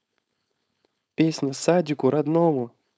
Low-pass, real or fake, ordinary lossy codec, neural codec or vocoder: none; fake; none; codec, 16 kHz, 4.8 kbps, FACodec